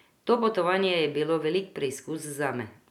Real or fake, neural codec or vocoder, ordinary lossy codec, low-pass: real; none; none; 19.8 kHz